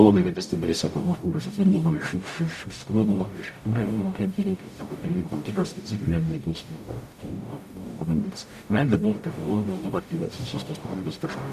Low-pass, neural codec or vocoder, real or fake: 14.4 kHz; codec, 44.1 kHz, 0.9 kbps, DAC; fake